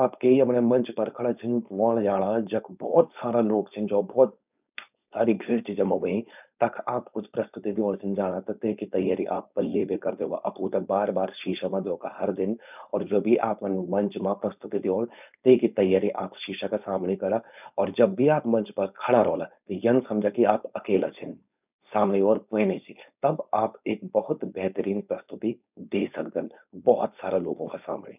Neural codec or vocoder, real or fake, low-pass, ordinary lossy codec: codec, 16 kHz, 4.8 kbps, FACodec; fake; 3.6 kHz; none